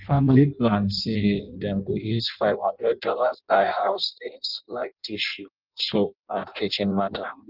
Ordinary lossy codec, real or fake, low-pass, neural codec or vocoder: Opus, 32 kbps; fake; 5.4 kHz; codec, 16 kHz in and 24 kHz out, 0.6 kbps, FireRedTTS-2 codec